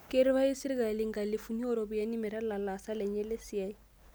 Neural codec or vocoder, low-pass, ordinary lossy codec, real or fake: none; none; none; real